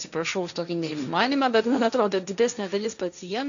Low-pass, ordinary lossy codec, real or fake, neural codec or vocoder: 7.2 kHz; MP3, 96 kbps; fake; codec, 16 kHz, 1.1 kbps, Voila-Tokenizer